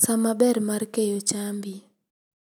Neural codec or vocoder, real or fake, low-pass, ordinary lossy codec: none; real; none; none